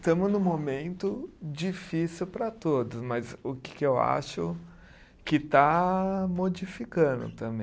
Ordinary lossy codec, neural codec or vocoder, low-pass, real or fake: none; none; none; real